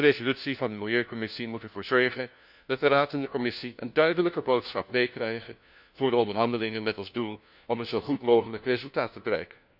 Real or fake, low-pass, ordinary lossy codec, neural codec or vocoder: fake; 5.4 kHz; none; codec, 16 kHz, 1 kbps, FunCodec, trained on LibriTTS, 50 frames a second